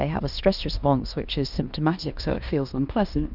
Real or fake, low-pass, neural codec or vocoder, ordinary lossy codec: fake; 5.4 kHz; codec, 16 kHz in and 24 kHz out, 0.9 kbps, LongCat-Audio-Codec, fine tuned four codebook decoder; Opus, 64 kbps